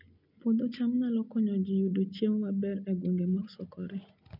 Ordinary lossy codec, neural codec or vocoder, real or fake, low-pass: none; none; real; 5.4 kHz